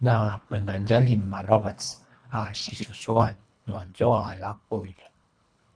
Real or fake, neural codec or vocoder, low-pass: fake; codec, 24 kHz, 1.5 kbps, HILCodec; 9.9 kHz